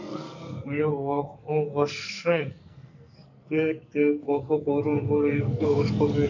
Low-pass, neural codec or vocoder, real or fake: 7.2 kHz; codec, 44.1 kHz, 2.6 kbps, SNAC; fake